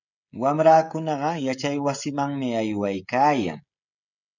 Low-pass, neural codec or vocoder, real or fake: 7.2 kHz; codec, 16 kHz, 16 kbps, FreqCodec, smaller model; fake